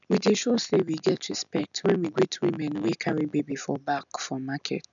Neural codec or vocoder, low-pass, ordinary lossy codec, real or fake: none; 7.2 kHz; none; real